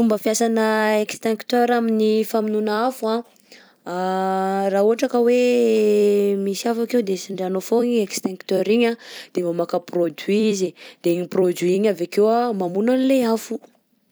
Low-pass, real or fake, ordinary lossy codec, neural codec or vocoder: none; fake; none; vocoder, 44.1 kHz, 128 mel bands every 256 samples, BigVGAN v2